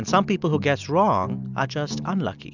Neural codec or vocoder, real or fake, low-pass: none; real; 7.2 kHz